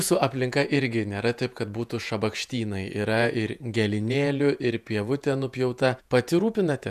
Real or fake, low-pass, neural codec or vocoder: fake; 14.4 kHz; vocoder, 48 kHz, 128 mel bands, Vocos